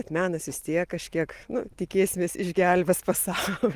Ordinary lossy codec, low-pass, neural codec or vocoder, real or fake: Opus, 24 kbps; 14.4 kHz; none; real